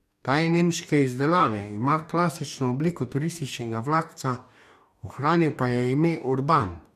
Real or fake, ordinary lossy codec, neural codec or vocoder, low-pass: fake; none; codec, 44.1 kHz, 2.6 kbps, DAC; 14.4 kHz